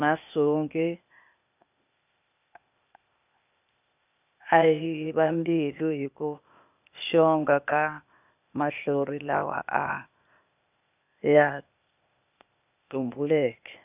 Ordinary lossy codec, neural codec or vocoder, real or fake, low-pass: none; codec, 16 kHz, 0.8 kbps, ZipCodec; fake; 3.6 kHz